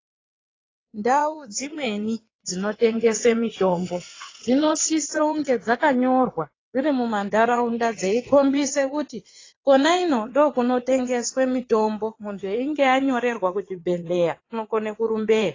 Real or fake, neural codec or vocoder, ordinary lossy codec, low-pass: fake; vocoder, 22.05 kHz, 80 mel bands, WaveNeXt; AAC, 32 kbps; 7.2 kHz